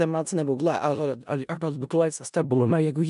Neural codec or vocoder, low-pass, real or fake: codec, 16 kHz in and 24 kHz out, 0.4 kbps, LongCat-Audio-Codec, four codebook decoder; 10.8 kHz; fake